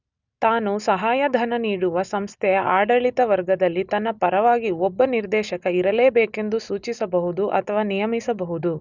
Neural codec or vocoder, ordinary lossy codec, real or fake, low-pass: none; none; real; 7.2 kHz